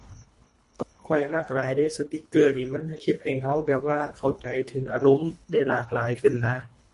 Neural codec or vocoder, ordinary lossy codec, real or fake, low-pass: codec, 24 kHz, 1.5 kbps, HILCodec; MP3, 48 kbps; fake; 10.8 kHz